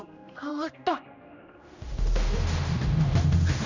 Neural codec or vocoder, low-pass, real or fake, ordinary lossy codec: codec, 16 kHz, 1 kbps, X-Codec, HuBERT features, trained on general audio; 7.2 kHz; fake; none